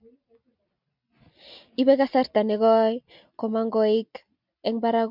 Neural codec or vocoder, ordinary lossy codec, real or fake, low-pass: none; MP3, 48 kbps; real; 5.4 kHz